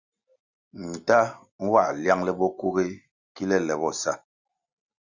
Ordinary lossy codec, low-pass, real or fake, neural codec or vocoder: Opus, 64 kbps; 7.2 kHz; real; none